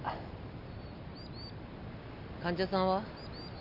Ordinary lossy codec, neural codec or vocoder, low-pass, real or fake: none; none; 5.4 kHz; real